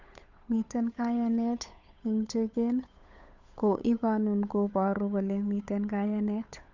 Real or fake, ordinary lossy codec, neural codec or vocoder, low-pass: fake; none; codec, 16 kHz, 8 kbps, FunCodec, trained on Chinese and English, 25 frames a second; 7.2 kHz